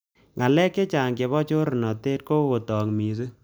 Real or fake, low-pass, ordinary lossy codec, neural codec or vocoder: real; none; none; none